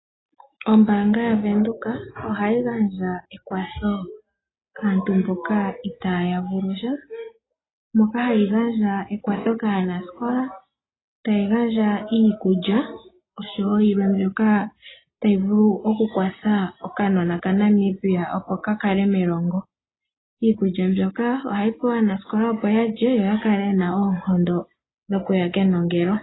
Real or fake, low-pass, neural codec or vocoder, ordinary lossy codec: real; 7.2 kHz; none; AAC, 16 kbps